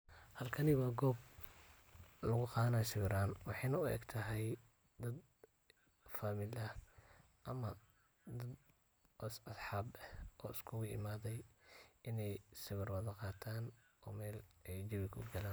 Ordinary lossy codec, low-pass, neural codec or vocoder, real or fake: none; none; none; real